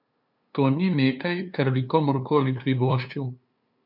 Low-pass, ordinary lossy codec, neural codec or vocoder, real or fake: 5.4 kHz; none; codec, 16 kHz, 2 kbps, FunCodec, trained on LibriTTS, 25 frames a second; fake